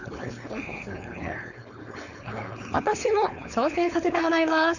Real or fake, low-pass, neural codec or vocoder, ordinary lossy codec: fake; 7.2 kHz; codec, 16 kHz, 4.8 kbps, FACodec; none